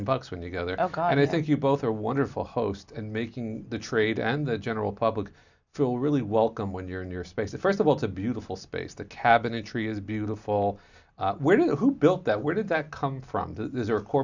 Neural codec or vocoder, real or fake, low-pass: none; real; 7.2 kHz